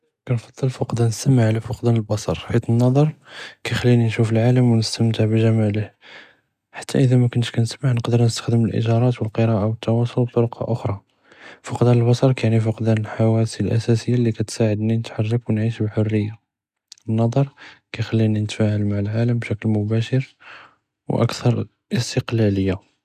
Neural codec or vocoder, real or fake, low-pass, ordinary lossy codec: none; real; 14.4 kHz; none